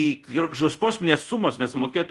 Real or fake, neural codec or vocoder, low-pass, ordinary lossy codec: fake; codec, 24 kHz, 0.5 kbps, DualCodec; 10.8 kHz; Opus, 24 kbps